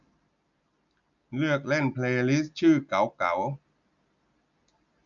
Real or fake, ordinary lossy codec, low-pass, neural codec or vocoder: real; none; 7.2 kHz; none